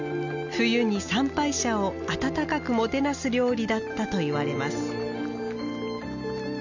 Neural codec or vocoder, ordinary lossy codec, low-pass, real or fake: none; none; 7.2 kHz; real